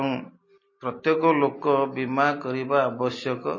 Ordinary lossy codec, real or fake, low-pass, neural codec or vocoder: MP3, 32 kbps; real; 7.2 kHz; none